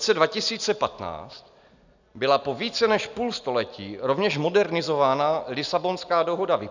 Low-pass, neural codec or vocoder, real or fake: 7.2 kHz; none; real